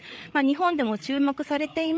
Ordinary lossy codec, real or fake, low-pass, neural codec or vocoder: none; fake; none; codec, 16 kHz, 8 kbps, FreqCodec, larger model